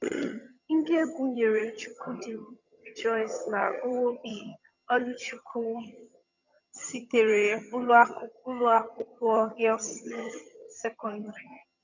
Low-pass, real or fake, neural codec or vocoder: 7.2 kHz; fake; vocoder, 22.05 kHz, 80 mel bands, HiFi-GAN